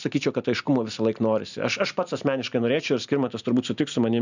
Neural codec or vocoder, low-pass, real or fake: none; 7.2 kHz; real